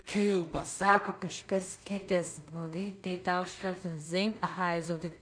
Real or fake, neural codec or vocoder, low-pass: fake; codec, 16 kHz in and 24 kHz out, 0.4 kbps, LongCat-Audio-Codec, two codebook decoder; 9.9 kHz